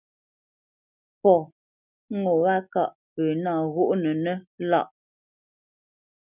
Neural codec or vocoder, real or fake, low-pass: none; real; 3.6 kHz